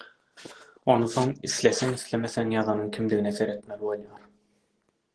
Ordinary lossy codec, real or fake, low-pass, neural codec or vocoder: Opus, 16 kbps; real; 10.8 kHz; none